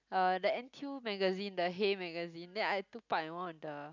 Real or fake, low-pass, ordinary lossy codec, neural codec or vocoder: real; 7.2 kHz; none; none